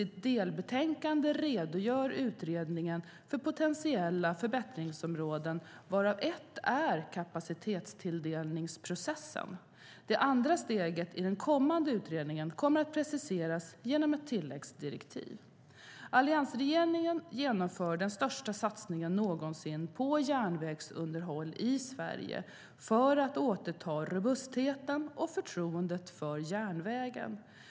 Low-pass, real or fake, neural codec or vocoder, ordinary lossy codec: none; real; none; none